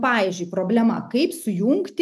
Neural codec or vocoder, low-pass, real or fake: none; 14.4 kHz; real